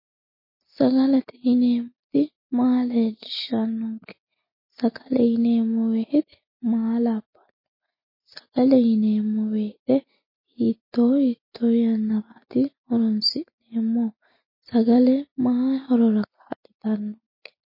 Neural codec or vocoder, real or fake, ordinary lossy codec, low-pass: none; real; MP3, 24 kbps; 5.4 kHz